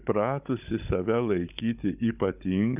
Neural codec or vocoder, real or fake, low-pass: codec, 16 kHz, 16 kbps, FunCodec, trained on Chinese and English, 50 frames a second; fake; 3.6 kHz